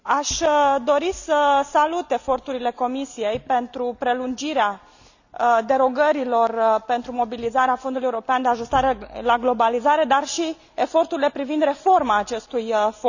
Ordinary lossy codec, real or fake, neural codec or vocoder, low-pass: none; real; none; 7.2 kHz